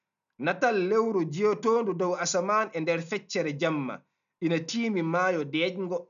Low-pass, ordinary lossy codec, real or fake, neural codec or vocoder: 7.2 kHz; none; real; none